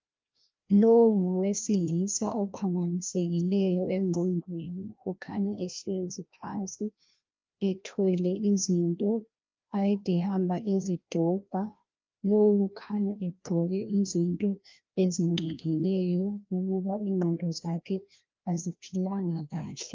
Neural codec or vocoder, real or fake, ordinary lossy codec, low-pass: codec, 16 kHz, 1 kbps, FreqCodec, larger model; fake; Opus, 24 kbps; 7.2 kHz